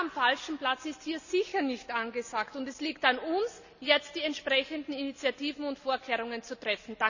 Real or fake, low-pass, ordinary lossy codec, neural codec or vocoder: real; 7.2 kHz; none; none